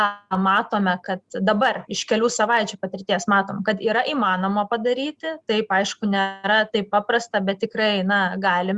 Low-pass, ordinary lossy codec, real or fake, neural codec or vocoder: 10.8 kHz; Opus, 64 kbps; real; none